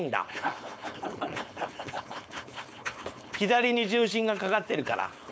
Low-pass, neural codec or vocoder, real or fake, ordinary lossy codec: none; codec, 16 kHz, 4.8 kbps, FACodec; fake; none